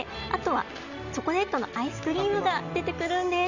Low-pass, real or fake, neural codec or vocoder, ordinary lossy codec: 7.2 kHz; real; none; none